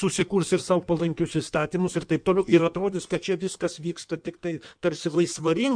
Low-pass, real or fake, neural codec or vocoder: 9.9 kHz; fake; codec, 16 kHz in and 24 kHz out, 1.1 kbps, FireRedTTS-2 codec